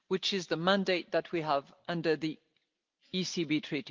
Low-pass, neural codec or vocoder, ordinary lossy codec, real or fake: 7.2 kHz; none; Opus, 32 kbps; real